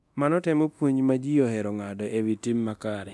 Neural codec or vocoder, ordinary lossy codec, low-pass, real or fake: codec, 24 kHz, 0.9 kbps, DualCodec; none; none; fake